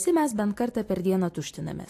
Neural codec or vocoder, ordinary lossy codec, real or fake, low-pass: none; AAC, 64 kbps; real; 14.4 kHz